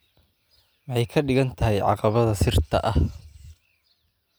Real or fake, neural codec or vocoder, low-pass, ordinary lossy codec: real; none; none; none